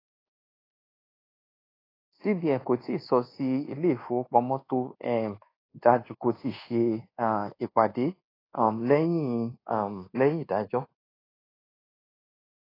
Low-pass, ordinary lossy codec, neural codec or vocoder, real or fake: 5.4 kHz; AAC, 24 kbps; codec, 24 kHz, 1.2 kbps, DualCodec; fake